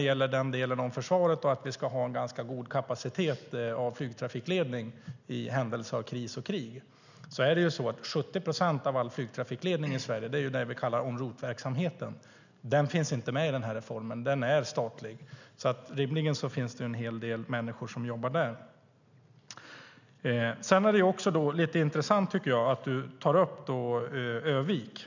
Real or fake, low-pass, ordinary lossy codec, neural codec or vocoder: real; 7.2 kHz; none; none